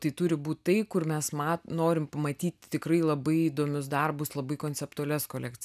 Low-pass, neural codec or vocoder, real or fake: 14.4 kHz; none; real